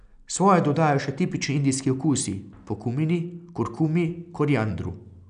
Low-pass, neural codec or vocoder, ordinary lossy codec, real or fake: 9.9 kHz; none; none; real